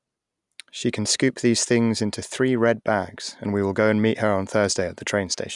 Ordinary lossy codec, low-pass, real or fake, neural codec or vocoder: none; 10.8 kHz; real; none